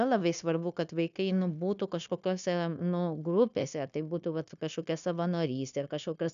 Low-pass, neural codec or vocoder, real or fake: 7.2 kHz; codec, 16 kHz, 0.9 kbps, LongCat-Audio-Codec; fake